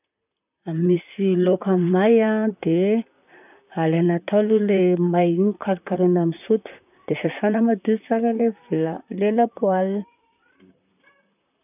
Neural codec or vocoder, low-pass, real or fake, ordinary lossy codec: vocoder, 44.1 kHz, 128 mel bands, Pupu-Vocoder; 3.6 kHz; fake; none